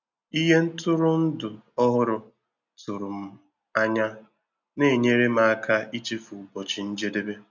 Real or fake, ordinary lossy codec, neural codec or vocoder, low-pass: real; none; none; 7.2 kHz